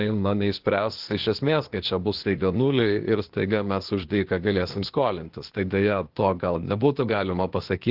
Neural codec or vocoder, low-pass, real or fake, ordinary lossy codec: codec, 16 kHz, 0.8 kbps, ZipCodec; 5.4 kHz; fake; Opus, 16 kbps